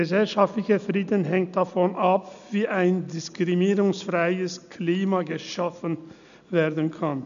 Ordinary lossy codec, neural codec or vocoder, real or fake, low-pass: none; none; real; 7.2 kHz